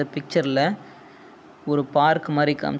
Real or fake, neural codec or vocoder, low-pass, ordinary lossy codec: real; none; none; none